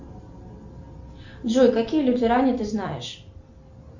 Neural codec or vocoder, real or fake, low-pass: none; real; 7.2 kHz